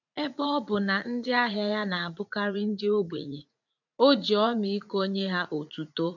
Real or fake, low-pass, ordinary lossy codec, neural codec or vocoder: fake; 7.2 kHz; none; vocoder, 44.1 kHz, 80 mel bands, Vocos